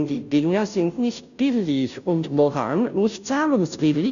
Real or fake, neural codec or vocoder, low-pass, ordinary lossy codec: fake; codec, 16 kHz, 0.5 kbps, FunCodec, trained on Chinese and English, 25 frames a second; 7.2 kHz; none